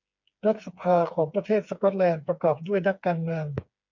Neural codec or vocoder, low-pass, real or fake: codec, 16 kHz, 4 kbps, FreqCodec, smaller model; 7.2 kHz; fake